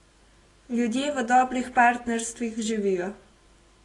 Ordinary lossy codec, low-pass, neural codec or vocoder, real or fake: AAC, 32 kbps; 10.8 kHz; none; real